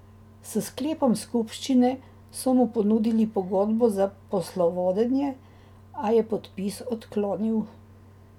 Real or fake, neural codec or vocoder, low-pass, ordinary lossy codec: real; none; 19.8 kHz; none